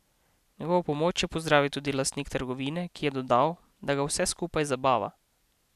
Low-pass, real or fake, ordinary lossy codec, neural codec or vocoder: 14.4 kHz; real; none; none